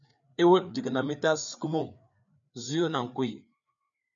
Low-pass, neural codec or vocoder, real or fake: 7.2 kHz; codec, 16 kHz, 4 kbps, FreqCodec, larger model; fake